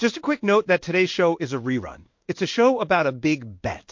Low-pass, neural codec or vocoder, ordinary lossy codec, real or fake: 7.2 kHz; codec, 16 kHz in and 24 kHz out, 1 kbps, XY-Tokenizer; MP3, 48 kbps; fake